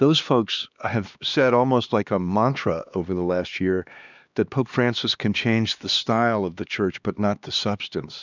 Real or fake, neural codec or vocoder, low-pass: fake; codec, 16 kHz, 2 kbps, X-Codec, HuBERT features, trained on LibriSpeech; 7.2 kHz